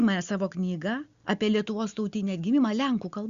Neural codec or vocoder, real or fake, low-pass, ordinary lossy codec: none; real; 7.2 kHz; Opus, 64 kbps